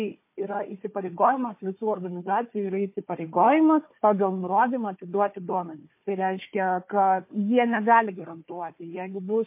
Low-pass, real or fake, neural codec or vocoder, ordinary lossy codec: 3.6 kHz; fake; codec, 16 kHz, 4 kbps, FunCodec, trained on Chinese and English, 50 frames a second; MP3, 24 kbps